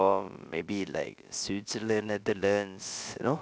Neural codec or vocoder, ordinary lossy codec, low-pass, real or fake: codec, 16 kHz, about 1 kbps, DyCAST, with the encoder's durations; none; none; fake